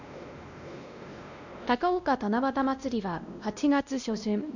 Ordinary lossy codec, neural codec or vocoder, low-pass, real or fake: none; codec, 16 kHz, 1 kbps, X-Codec, WavLM features, trained on Multilingual LibriSpeech; 7.2 kHz; fake